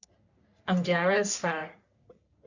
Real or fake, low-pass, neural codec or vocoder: fake; 7.2 kHz; codec, 44.1 kHz, 3.4 kbps, Pupu-Codec